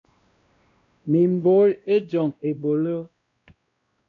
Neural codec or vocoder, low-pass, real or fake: codec, 16 kHz, 1 kbps, X-Codec, WavLM features, trained on Multilingual LibriSpeech; 7.2 kHz; fake